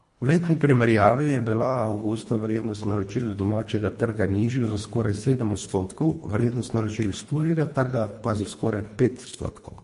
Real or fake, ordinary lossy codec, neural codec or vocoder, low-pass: fake; MP3, 48 kbps; codec, 24 kHz, 1.5 kbps, HILCodec; 10.8 kHz